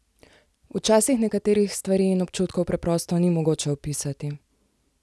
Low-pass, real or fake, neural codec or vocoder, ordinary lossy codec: none; real; none; none